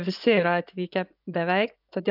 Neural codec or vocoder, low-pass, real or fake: vocoder, 24 kHz, 100 mel bands, Vocos; 5.4 kHz; fake